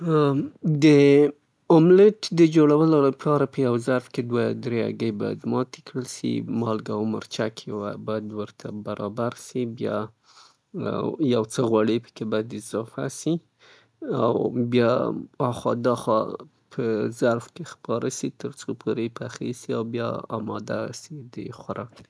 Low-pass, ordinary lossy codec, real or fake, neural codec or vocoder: none; none; real; none